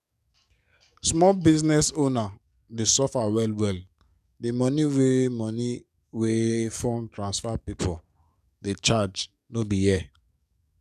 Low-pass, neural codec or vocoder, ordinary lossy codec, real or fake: 14.4 kHz; codec, 44.1 kHz, 7.8 kbps, DAC; none; fake